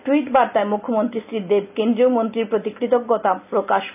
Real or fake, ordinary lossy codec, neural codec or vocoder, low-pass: real; none; none; 3.6 kHz